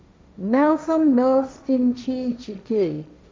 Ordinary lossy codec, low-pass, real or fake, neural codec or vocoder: none; none; fake; codec, 16 kHz, 1.1 kbps, Voila-Tokenizer